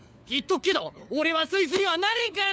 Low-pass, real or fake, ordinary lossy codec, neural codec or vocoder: none; fake; none; codec, 16 kHz, 4 kbps, FunCodec, trained on LibriTTS, 50 frames a second